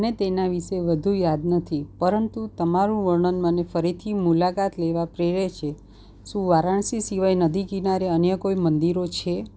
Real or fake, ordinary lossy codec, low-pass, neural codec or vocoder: real; none; none; none